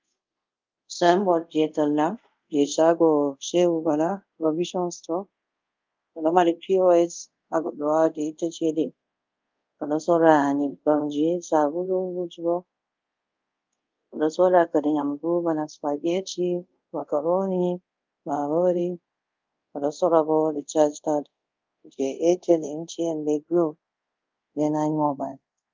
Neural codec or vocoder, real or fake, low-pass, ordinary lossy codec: codec, 24 kHz, 0.5 kbps, DualCodec; fake; 7.2 kHz; Opus, 24 kbps